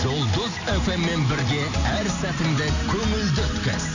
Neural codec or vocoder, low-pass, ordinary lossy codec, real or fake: none; 7.2 kHz; none; real